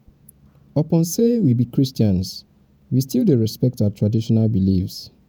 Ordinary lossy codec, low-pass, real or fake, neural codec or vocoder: none; none; fake; vocoder, 48 kHz, 128 mel bands, Vocos